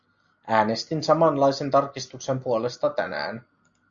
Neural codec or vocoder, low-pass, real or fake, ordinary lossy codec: none; 7.2 kHz; real; AAC, 64 kbps